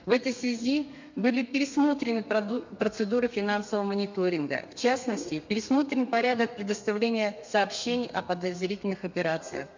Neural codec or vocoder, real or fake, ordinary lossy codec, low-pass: codec, 32 kHz, 1.9 kbps, SNAC; fake; MP3, 64 kbps; 7.2 kHz